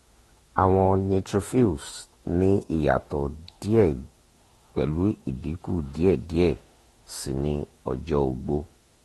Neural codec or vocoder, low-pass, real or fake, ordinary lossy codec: autoencoder, 48 kHz, 32 numbers a frame, DAC-VAE, trained on Japanese speech; 19.8 kHz; fake; AAC, 32 kbps